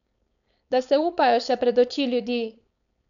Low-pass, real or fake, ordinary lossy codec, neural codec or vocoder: 7.2 kHz; fake; none; codec, 16 kHz, 4.8 kbps, FACodec